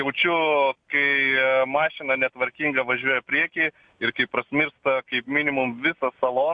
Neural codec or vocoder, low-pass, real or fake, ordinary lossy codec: none; 9.9 kHz; real; MP3, 64 kbps